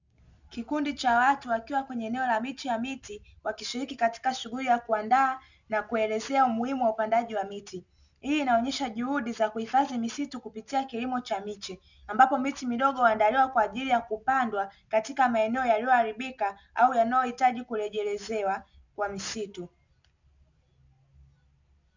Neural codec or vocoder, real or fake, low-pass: none; real; 7.2 kHz